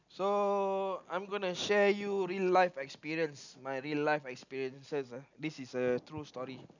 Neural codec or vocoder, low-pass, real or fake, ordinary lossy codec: none; 7.2 kHz; real; none